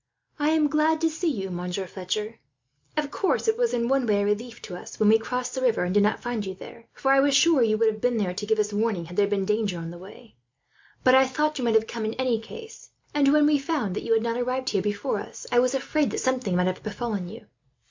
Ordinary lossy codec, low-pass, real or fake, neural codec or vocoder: MP3, 64 kbps; 7.2 kHz; real; none